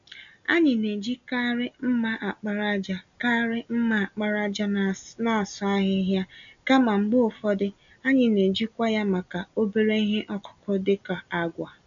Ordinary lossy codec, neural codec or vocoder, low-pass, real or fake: none; none; 7.2 kHz; real